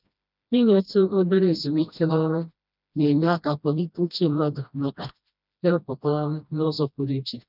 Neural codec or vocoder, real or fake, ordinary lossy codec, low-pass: codec, 16 kHz, 1 kbps, FreqCodec, smaller model; fake; none; 5.4 kHz